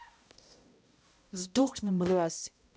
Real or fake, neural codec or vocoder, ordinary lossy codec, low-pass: fake; codec, 16 kHz, 0.5 kbps, X-Codec, HuBERT features, trained on balanced general audio; none; none